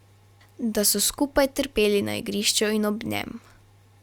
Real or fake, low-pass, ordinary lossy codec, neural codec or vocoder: real; 19.8 kHz; Opus, 64 kbps; none